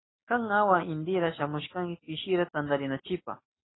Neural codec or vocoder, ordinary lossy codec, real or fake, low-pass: none; AAC, 16 kbps; real; 7.2 kHz